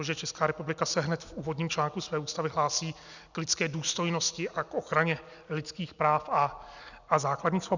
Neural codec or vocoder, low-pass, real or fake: none; 7.2 kHz; real